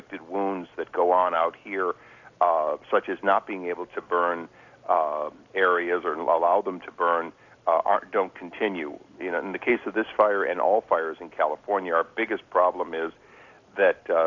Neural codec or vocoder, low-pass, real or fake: none; 7.2 kHz; real